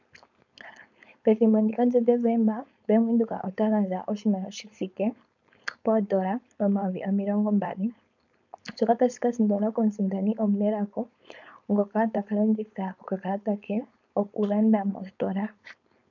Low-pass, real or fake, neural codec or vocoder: 7.2 kHz; fake; codec, 16 kHz, 4.8 kbps, FACodec